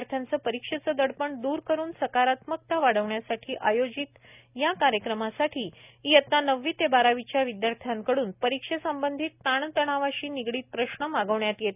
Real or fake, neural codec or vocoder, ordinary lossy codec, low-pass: real; none; none; 3.6 kHz